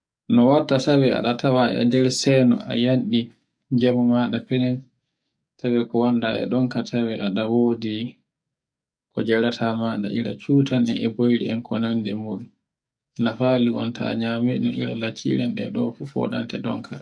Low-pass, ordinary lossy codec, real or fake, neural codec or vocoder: 9.9 kHz; none; fake; codec, 44.1 kHz, 7.8 kbps, DAC